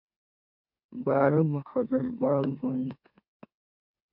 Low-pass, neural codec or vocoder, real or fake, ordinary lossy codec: 5.4 kHz; autoencoder, 44.1 kHz, a latent of 192 numbers a frame, MeloTTS; fake; MP3, 48 kbps